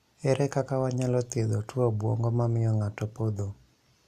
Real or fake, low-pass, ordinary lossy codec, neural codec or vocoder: real; 14.4 kHz; MP3, 96 kbps; none